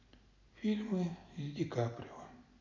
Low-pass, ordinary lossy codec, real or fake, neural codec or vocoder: 7.2 kHz; none; real; none